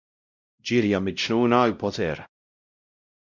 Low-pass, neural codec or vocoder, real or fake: 7.2 kHz; codec, 16 kHz, 0.5 kbps, X-Codec, WavLM features, trained on Multilingual LibriSpeech; fake